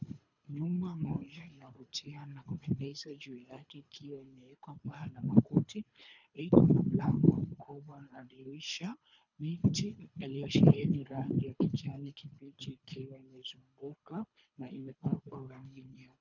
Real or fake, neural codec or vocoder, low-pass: fake; codec, 24 kHz, 3 kbps, HILCodec; 7.2 kHz